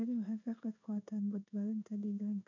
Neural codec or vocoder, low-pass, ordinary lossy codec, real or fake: codec, 16 kHz in and 24 kHz out, 1 kbps, XY-Tokenizer; 7.2 kHz; none; fake